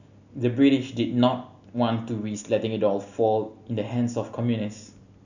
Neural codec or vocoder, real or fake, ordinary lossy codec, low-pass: none; real; none; 7.2 kHz